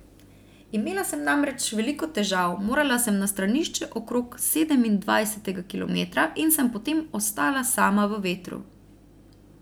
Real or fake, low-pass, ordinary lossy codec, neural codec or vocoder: real; none; none; none